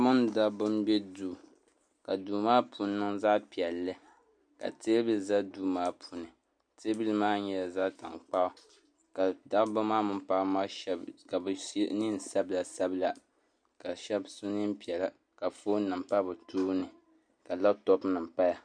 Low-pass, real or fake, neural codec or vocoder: 9.9 kHz; real; none